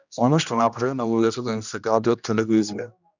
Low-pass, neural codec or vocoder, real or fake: 7.2 kHz; codec, 16 kHz, 1 kbps, X-Codec, HuBERT features, trained on general audio; fake